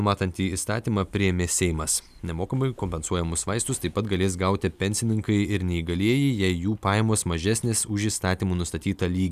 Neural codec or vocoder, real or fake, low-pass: none; real; 14.4 kHz